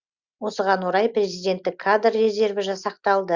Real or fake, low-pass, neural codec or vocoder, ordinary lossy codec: real; 7.2 kHz; none; Opus, 64 kbps